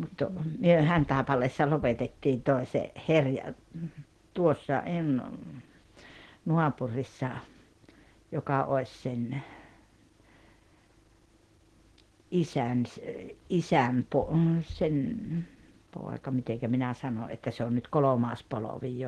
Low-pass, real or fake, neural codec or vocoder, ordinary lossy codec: 10.8 kHz; real; none; Opus, 16 kbps